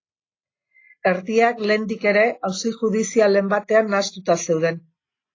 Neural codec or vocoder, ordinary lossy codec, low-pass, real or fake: none; AAC, 32 kbps; 7.2 kHz; real